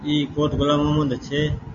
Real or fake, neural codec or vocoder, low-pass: real; none; 7.2 kHz